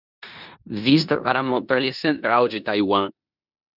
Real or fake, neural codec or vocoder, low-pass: fake; codec, 16 kHz in and 24 kHz out, 0.9 kbps, LongCat-Audio-Codec, four codebook decoder; 5.4 kHz